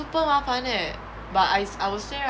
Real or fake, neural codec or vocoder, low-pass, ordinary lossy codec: real; none; none; none